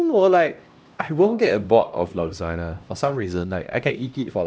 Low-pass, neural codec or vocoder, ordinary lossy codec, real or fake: none; codec, 16 kHz, 1 kbps, X-Codec, HuBERT features, trained on LibriSpeech; none; fake